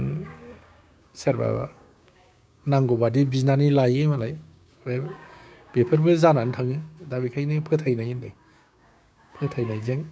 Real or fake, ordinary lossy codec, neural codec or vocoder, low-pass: fake; none; codec, 16 kHz, 6 kbps, DAC; none